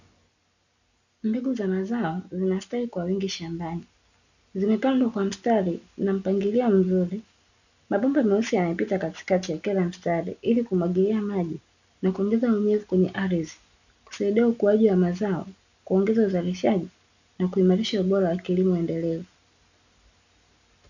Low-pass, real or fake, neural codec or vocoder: 7.2 kHz; real; none